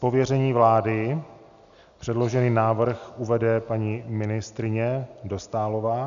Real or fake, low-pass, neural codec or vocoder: real; 7.2 kHz; none